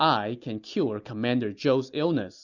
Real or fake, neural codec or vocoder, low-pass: real; none; 7.2 kHz